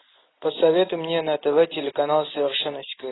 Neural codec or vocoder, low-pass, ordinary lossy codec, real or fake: none; 7.2 kHz; AAC, 16 kbps; real